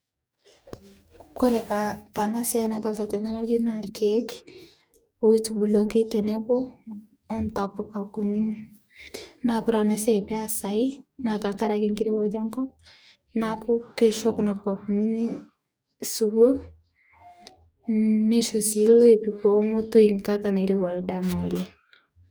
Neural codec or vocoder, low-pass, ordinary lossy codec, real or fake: codec, 44.1 kHz, 2.6 kbps, DAC; none; none; fake